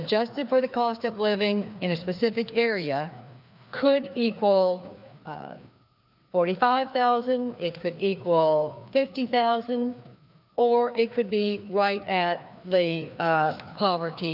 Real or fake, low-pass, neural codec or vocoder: fake; 5.4 kHz; codec, 16 kHz, 2 kbps, FreqCodec, larger model